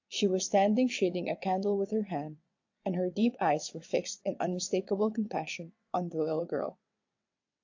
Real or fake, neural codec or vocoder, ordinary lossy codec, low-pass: fake; vocoder, 22.05 kHz, 80 mel bands, WaveNeXt; AAC, 48 kbps; 7.2 kHz